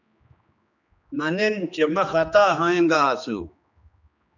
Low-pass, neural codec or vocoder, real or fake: 7.2 kHz; codec, 16 kHz, 2 kbps, X-Codec, HuBERT features, trained on general audio; fake